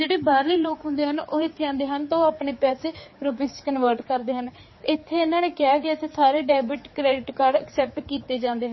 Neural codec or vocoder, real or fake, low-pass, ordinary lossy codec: codec, 16 kHz, 4 kbps, X-Codec, HuBERT features, trained on general audio; fake; 7.2 kHz; MP3, 24 kbps